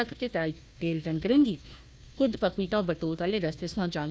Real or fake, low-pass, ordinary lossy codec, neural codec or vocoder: fake; none; none; codec, 16 kHz, 1 kbps, FunCodec, trained on Chinese and English, 50 frames a second